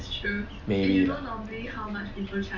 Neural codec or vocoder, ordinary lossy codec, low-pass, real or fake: none; none; 7.2 kHz; real